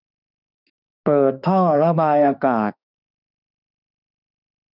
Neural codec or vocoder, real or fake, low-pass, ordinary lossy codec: autoencoder, 48 kHz, 32 numbers a frame, DAC-VAE, trained on Japanese speech; fake; 5.4 kHz; none